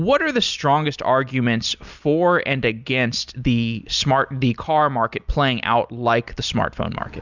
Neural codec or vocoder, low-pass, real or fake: none; 7.2 kHz; real